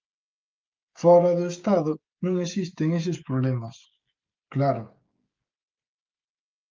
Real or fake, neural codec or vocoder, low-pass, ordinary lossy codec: fake; codec, 16 kHz, 16 kbps, FreqCodec, smaller model; 7.2 kHz; Opus, 32 kbps